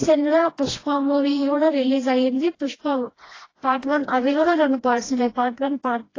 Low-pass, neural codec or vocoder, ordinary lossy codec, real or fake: 7.2 kHz; codec, 16 kHz, 1 kbps, FreqCodec, smaller model; AAC, 32 kbps; fake